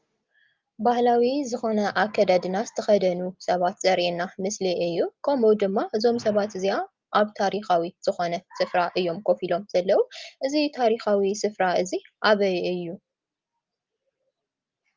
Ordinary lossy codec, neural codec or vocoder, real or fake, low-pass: Opus, 32 kbps; none; real; 7.2 kHz